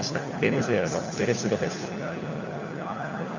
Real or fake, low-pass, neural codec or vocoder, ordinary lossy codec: fake; 7.2 kHz; codec, 16 kHz, 4 kbps, FunCodec, trained on LibriTTS, 50 frames a second; none